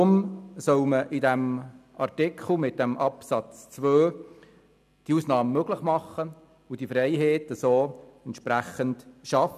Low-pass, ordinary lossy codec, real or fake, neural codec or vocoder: 14.4 kHz; none; real; none